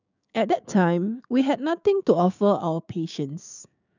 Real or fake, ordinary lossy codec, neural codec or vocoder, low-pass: fake; none; codec, 16 kHz, 6 kbps, DAC; 7.2 kHz